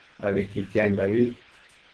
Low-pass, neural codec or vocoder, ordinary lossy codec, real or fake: 10.8 kHz; codec, 24 kHz, 1.5 kbps, HILCodec; Opus, 16 kbps; fake